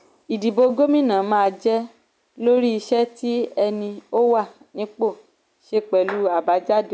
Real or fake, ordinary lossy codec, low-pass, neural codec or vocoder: real; none; none; none